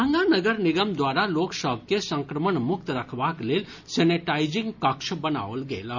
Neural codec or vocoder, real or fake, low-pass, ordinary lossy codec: none; real; 7.2 kHz; none